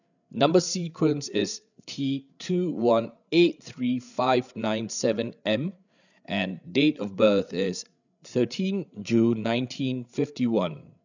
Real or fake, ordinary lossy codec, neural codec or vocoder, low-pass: fake; none; codec, 16 kHz, 8 kbps, FreqCodec, larger model; 7.2 kHz